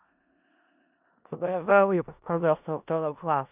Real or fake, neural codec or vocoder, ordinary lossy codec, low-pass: fake; codec, 16 kHz in and 24 kHz out, 0.4 kbps, LongCat-Audio-Codec, four codebook decoder; none; 3.6 kHz